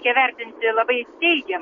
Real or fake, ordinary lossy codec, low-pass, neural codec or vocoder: real; MP3, 64 kbps; 7.2 kHz; none